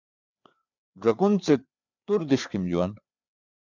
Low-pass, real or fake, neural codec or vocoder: 7.2 kHz; fake; codec, 16 kHz, 4 kbps, FreqCodec, larger model